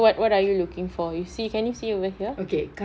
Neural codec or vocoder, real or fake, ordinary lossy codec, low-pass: none; real; none; none